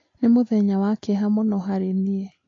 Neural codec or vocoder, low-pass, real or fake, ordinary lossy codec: none; 7.2 kHz; real; AAC, 32 kbps